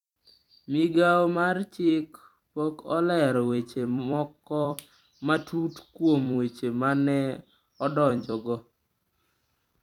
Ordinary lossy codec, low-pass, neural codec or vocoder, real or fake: none; 19.8 kHz; vocoder, 44.1 kHz, 128 mel bands every 256 samples, BigVGAN v2; fake